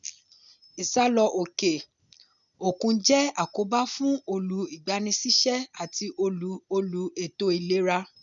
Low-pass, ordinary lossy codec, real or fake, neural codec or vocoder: 7.2 kHz; none; real; none